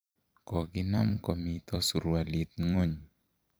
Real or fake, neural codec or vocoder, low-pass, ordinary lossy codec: fake; vocoder, 44.1 kHz, 128 mel bands every 256 samples, BigVGAN v2; none; none